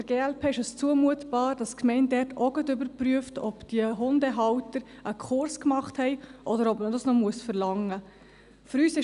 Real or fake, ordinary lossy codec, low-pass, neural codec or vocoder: real; none; 10.8 kHz; none